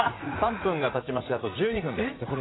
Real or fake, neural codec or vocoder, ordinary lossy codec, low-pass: fake; vocoder, 44.1 kHz, 80 mel bands, Vocos; AAC, 16 kbps; 7.2 kHz